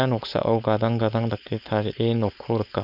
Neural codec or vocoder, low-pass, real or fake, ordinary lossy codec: codec, 16 kHz, 4.8 kbps, FACodec; 5.4 kHz; fake; none